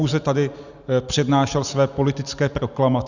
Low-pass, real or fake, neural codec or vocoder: 7.2 kHz; real; none